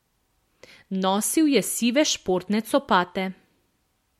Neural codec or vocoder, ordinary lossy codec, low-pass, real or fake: none; MP3, 64 kbps; 19.8 kHz; real